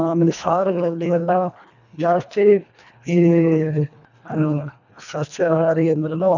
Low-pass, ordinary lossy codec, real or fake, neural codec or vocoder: 7.2 kHz; none; fake; codec, 24 kHz, 1.5 kbps, HILCodec